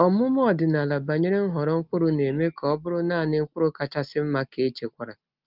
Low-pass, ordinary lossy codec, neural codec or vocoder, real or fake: 5.4 kHz; Opus, 32 kbps; none; real